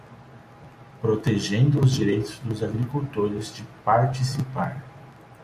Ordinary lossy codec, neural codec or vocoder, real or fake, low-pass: MP3, 64 kbps; none; real; 14.4 kHz